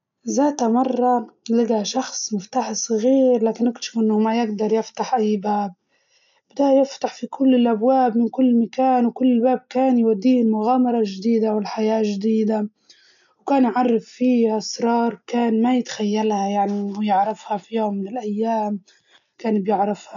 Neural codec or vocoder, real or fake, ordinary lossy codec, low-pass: none; real; none; 7.2 kHz